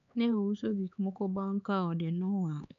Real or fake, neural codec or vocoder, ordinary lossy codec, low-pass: fake; codec, 16 kHz, 4 kbps, X-Codec, HuBERT features, trained on LibriSpeech; none; 7.2 kHz